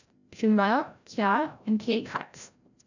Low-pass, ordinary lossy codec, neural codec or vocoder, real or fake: 7.2 kHz; none; codec, 16 kHz, 0.5 kbps, FreqCodec, larger model; fake